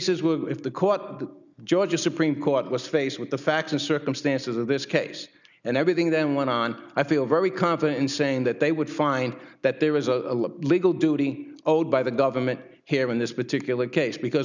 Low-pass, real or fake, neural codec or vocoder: 7.2 kHz; real; none